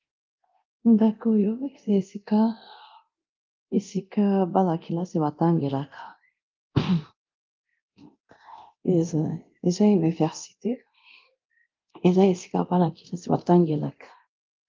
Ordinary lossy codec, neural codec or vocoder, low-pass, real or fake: Opus, 32 kbps; codec, 24 kHz, 0.9 kbps, DualCodec; 7.2 kHz; fake